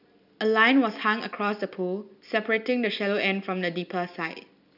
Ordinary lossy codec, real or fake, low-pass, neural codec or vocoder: none; real; 5.4 kHz; none